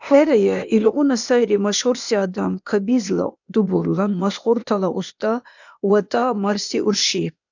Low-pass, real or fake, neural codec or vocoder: 7.2 kHz; fake; codec, 16 kHz, 0.8 kbps, ZipCodec